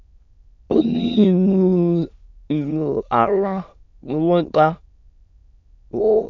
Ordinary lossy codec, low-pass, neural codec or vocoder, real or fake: none; 7.2 kHz; autoencoder, 22.05 kHz, a latent of 192 numbers a frame, VITS, trained on many speakers; fake